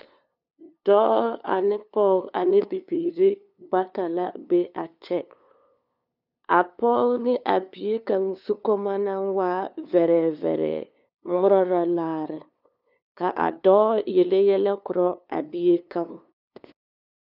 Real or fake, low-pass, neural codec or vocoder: fake; 5.4 kHz; codec, 16 kHz, 2 kbps, FunCodec, trained on LibriTTS, 25 frames a second